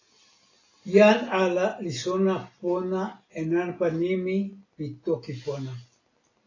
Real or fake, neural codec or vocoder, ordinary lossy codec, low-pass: real; none; AAC, 32 kbps; 7.2 kHz